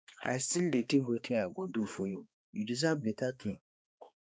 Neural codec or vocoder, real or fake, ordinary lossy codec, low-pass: codec, 16 kHz, 2 kbps, X-Codec, HuBERT features, trained on balanced general audio; fake; none; none